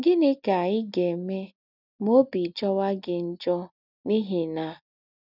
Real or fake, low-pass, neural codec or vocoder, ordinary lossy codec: real; 5.4 kHz; none; none